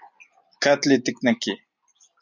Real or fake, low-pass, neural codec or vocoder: real; 7.2 kHz; none